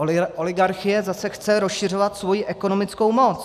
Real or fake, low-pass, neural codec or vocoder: real; 14.4 kHz; none